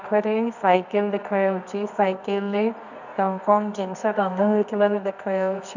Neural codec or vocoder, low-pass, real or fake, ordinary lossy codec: codec, 24 kHz, 0.9 kbps, WavTokenizer, medium music audio release; 7.2 kHz; fake; none